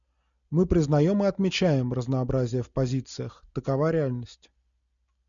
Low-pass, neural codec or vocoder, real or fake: 7.2 kHz; none; real